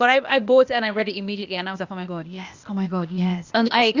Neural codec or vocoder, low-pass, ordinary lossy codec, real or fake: codec, 16 kHz, 0.8 kbps, ZipCodec; 7.2 kHz; Opus, 64 kbps; fake